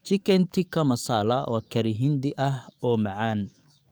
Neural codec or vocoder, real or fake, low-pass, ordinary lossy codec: codec, 44.1 kHz, 7.8 kbps, DAC; fake; none; none